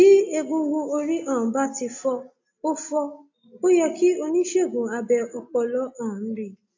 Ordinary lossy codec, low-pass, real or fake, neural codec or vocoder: AAC, 48 kbps; 7.2 kHz; real; none